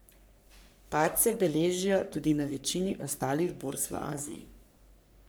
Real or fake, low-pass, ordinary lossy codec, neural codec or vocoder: fake; none; none; codec, 44.1 kHz, 3.4 kbps, Pupu-Codec